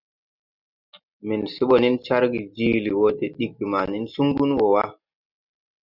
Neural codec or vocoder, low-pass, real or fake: none; 5.4 kHz; real